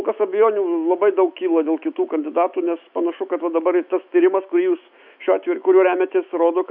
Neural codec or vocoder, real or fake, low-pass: autoencoder, 48 kHz, 128 numbers a frame, DAC-VAE, trained on Japanese speech; fake; 5.4 kHz